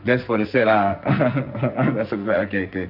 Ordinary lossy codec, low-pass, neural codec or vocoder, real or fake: none; 5.4 kHz; codec, 44.1 kHz, 2.6 kbps, SNAC; fake